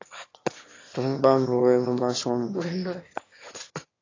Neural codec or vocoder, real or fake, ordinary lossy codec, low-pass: autoencoder, 22.05 kHz, a latent of 192 numbers a frame, VITS, trained on one speaker; fake; AAC, 32 kbps; 7.2 kHz